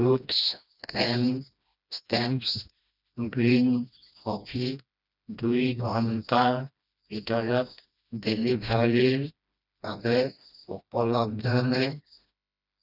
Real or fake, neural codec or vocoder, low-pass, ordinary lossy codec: fake; codec, 16 kHz, 1 kbps, FreqCodec, smaller model; 5.4 kHz; none